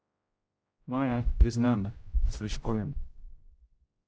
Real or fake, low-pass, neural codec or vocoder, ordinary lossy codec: fake; none; codec, 16 kHz, 0.5 kbps, X-Codec, HuBERT features, trained on general audio; none